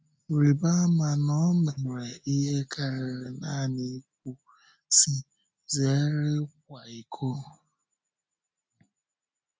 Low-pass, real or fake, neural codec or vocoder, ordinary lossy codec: none; real; none; none